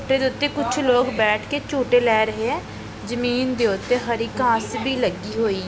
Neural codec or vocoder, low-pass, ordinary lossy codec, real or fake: none; none; none; real